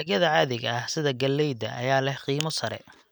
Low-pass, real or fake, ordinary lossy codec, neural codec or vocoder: none; real; none; none